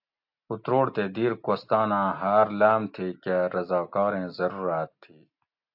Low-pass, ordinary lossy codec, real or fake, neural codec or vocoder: 5.4 kHz; AAC, 48 kbps; real; none